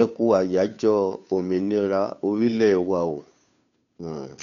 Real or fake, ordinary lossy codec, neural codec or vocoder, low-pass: fake; none; codec, 16 kHz, 2 kbps, FunCodec, trained on Chinese and English, 25 frames a second; 7.2 kHz